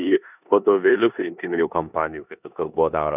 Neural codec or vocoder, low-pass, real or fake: codec, 16 kHz in and 24 kHz out, 0.9 kbps, LongCat-Audio-Codec, four codebook decoder; 3.6 kHz; fake